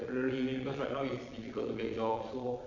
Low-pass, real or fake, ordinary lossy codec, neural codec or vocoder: 7.2 kHz; fake; none; vocoder, 22.05 kHz, 80 mel bands, Vocos